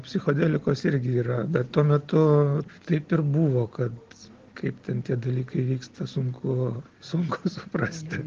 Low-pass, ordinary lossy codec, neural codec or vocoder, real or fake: 7.2 kHz; Opus, 16 kbps; none; real